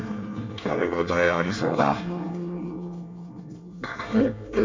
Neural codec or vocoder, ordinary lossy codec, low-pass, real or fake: codec, 24 kHz, 1 kbps, SNAC; MP3, 64 kbps; 7.2 kHz; fake